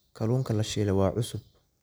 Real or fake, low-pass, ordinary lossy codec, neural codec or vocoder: real; none; none; none